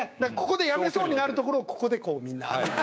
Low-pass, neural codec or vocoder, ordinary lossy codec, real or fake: none; codec, 16 kHz, 6 kbps, DAC; none; fake